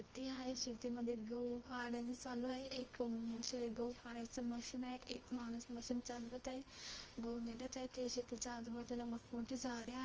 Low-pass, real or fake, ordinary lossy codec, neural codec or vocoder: 7.2 kHz; fake; Opus, 24 kbps; codec, 24 kHz, 0.9 kbps, WavTokenizer, medium music audio release